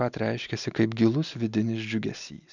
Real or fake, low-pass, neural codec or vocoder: real; 7.2 kHz; none